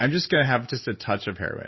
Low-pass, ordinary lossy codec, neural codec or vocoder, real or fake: 7.2 kHz; MP3, 24 kbps; none; real